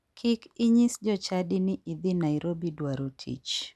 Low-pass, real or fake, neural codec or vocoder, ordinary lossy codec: none; real; none; none